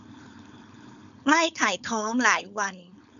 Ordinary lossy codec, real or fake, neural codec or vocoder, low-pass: none; fake; codec, 16 kHz, 4.8 kbps, FACodec; 7.2 kHz